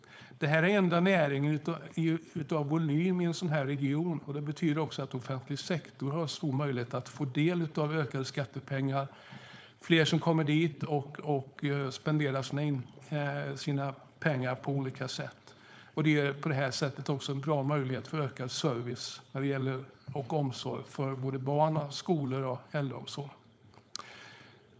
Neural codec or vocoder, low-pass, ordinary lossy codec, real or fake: codec, 16 kHz, 4.8 kbps, FACodec; none; none; fake